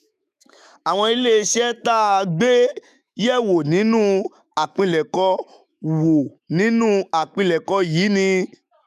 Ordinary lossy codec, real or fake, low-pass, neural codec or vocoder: none; fake; 14.4 kHz; autoencoder, 48 kHz, 128 numbers a frame, DAC-VAE, trained on Japanese speech